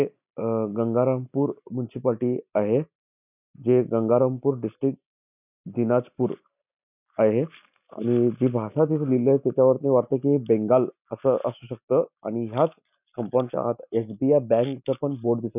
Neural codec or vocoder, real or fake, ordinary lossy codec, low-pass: none; real; none; 3.6 kHz